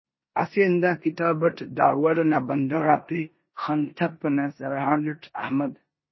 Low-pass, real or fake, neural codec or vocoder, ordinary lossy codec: 7.2 kHz; fake; codec, 16 kHz in and 24 kHz out, 0.9 kbps, LongCat-Audio-Codec, four codebook decoder; MP3, 24 kbps